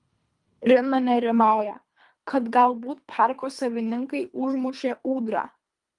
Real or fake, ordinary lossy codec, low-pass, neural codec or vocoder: fake; Opus, 32 kbps; 10.8 kHz; codec, 24 kHz, 3 kbps, HILCodec